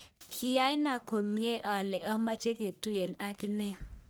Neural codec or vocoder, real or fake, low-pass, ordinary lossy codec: codec, 44.1 kHz, 1.7 kbps, Pupu-Codec; fake; none; none